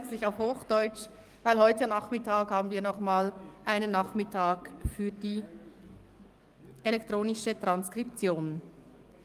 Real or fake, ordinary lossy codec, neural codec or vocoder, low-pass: fake; Opus, 32 kbps; codec, 44.1 kHz, 7.8 kbps, DAC; 14.4 kHz